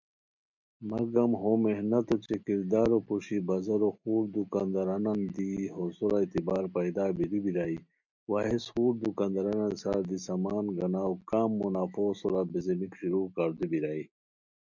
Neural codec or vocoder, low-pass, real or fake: none; 7.2 kHz; real